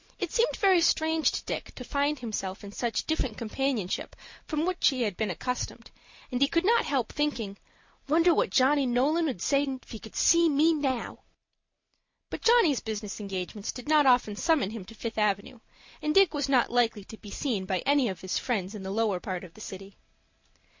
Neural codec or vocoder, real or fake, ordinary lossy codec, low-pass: none; real; MP3, 48 kbps; 7.2 kHz